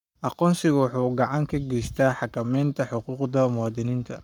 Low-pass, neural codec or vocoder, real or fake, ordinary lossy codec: 19.8 kHz; codec, 44.1 kHz, 7.8 kbps, Pupu-Codec; fake; none